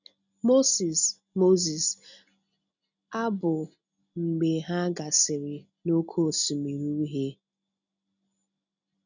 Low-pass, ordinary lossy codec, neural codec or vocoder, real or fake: 7.2 kHz; none; none; real